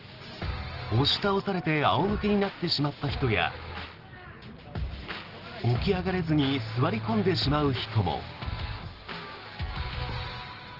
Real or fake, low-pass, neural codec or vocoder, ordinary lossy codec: real; 5.4 kHz; none; Opus, 16 kbps